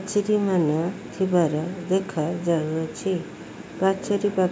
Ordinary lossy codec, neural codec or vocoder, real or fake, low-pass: none; none; real; none